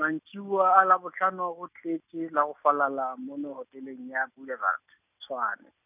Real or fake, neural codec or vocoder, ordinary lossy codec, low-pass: real; none; none; 3.6 kHz